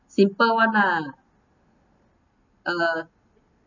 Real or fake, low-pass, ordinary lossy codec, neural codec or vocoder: real; 7.2 kHz; none; none